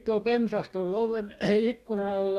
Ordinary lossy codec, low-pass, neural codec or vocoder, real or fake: none; 14.4 kHz; codec, 44.1 kHz, 2.6 kbps, DAC; fake